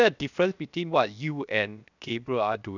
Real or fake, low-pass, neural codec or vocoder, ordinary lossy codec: fake; 7.2 kHz; codec, 16 kHz, 0.7 kbps, FocalCodec; none